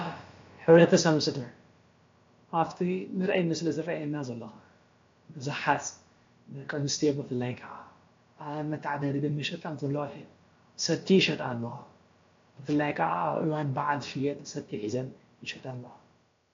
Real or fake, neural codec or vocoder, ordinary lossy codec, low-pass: fake; codec, 16 kHz, about 1 kbps, DyCAST, with the encoder's durations; AAC, 48 kbps; 7.2 kHz